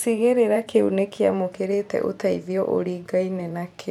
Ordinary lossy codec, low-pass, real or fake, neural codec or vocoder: none; 19.8 kHz; fake; vocoder, 44.1 kHz, 128 mel bands every 512 samples, BigVGAN v2